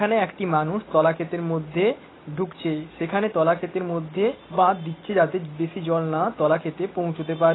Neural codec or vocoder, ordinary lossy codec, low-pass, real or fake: none; AAC, 16 kbps; 7.2 kHz; real